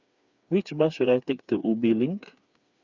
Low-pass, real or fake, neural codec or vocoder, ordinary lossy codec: 7.2 kHz; fake; codec, 16 kHz, 4 kbps, FreqCodec, smaller model; Opus, 64 kbps